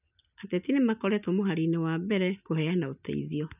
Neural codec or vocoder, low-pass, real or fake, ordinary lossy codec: none; 3.6 kHz; real; none